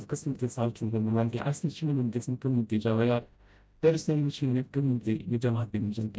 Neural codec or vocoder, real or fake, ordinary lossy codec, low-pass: codec, 16 kHz, 0.5 kbps, FreqCodec, smaller model; fake; none; none